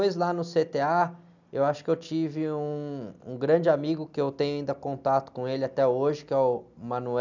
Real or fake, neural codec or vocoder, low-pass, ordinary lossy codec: real; none; 7.2 kHz; none